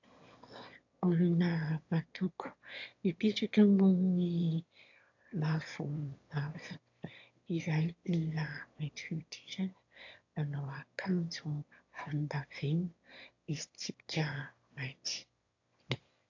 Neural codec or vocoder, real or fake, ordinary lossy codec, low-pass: autoencoder, 22.05 kHz, a latent of 192 numbers a frame, VITS, trained on one speaker; fake; AAC, 48 kbps; 7.2 kHz